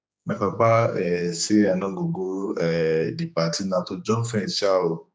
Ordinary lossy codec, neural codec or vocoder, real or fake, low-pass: none; codec, 16 kHz, 2 kbps, X-Codec, HuBERT features, trained on general audio; fake; none